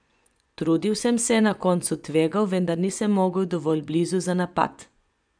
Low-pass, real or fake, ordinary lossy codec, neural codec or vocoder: 9.9 kHz; fake; none; vocoder, 24 kHz, 100 mel bands, Vocos